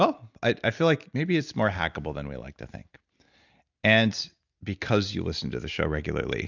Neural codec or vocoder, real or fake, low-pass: none; real; 7.2 kHz